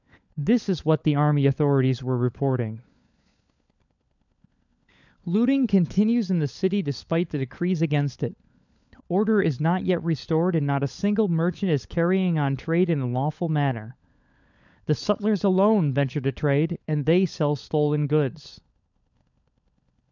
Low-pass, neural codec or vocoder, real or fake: 7.2 kHz; codec, 16 kHz, 16 kbps, FunCodec, trained on LibriTTS, 50 frames a second; fake